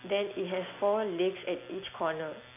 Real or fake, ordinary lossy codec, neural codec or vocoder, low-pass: real; none; none; 3.6 kHz